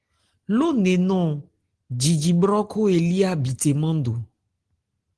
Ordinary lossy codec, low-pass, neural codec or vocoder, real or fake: Opus, 16 kbps; 10.8 kHz; none; real